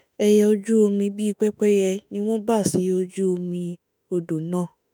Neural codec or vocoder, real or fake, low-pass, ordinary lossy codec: autoencoder, 48 kHz, 32 numbers a frame, DAC-VAE, trained on Japanese speech; fake; none; none